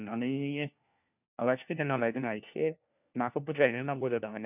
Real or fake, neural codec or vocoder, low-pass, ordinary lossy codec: fake; codec, 16 kHz, 1 kbps, FunCodec, trained on LibriTTS, 50 frames a second; 3.6 kHz; none